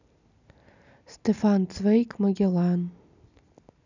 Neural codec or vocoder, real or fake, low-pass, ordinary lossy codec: none; real; 7.2 kHz; none